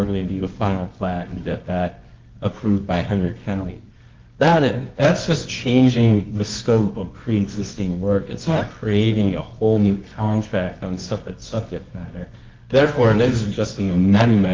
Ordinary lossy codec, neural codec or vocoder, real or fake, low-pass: Opus, 32 kbps; codec, 24 kHz, 0.9 kbps, WavTokenizer, medium music audio release; fake; 7.2 kHz